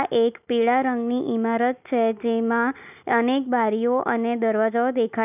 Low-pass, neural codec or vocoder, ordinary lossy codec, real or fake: 3.6 kHz; none; none; real